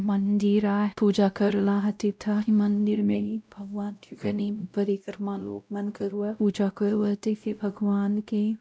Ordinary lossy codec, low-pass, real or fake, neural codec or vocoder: none; none; fake; codec, 16 kHz, 0.5 kbps, X-Codec, WavLM features, trained on Multilingual LibriSpeech